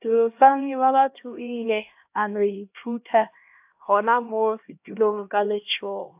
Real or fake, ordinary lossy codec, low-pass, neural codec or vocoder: fake; AAC, 32 kbps; 3.6 kHz; codec, 16 kHz, 1 kbps, X-Codec, HuBERT features, trained on LibriSpeech